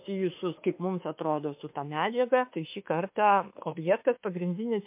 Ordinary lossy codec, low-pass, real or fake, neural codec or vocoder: MP3, 32 kbps; 3.6 kHz; fake; autoencoder, 48 kHz, 32 numbers a frame, DAC-VAE, trained on Japanese speech